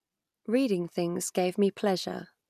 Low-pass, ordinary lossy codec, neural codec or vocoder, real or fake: 14.4 kHz; none; none; real